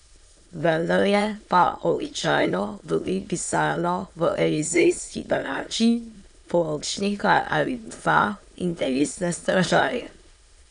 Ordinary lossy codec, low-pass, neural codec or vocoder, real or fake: none; 9.9 kHz; autoencoder, 22.05 kHz, a latent of 192 numbers a frame, VITS, trained on many speakers; fake